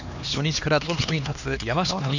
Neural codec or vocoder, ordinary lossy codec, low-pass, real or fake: codec, 16 kHz, 2 kbps, X-Codec, HuBERT features, trained on LibriSpeech; none; 7.2 kHz; fake